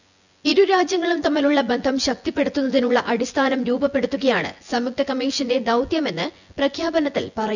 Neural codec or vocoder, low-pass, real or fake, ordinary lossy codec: vocoder, 24 kHz, 100 mel bands, Vocos; 7.2 kHz; fake; none